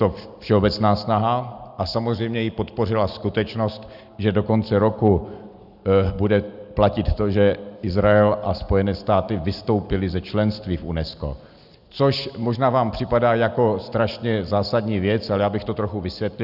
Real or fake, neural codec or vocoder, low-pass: real; none; 5.4 kHz